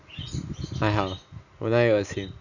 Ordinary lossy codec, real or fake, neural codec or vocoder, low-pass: none; real; none; 7.2 kHz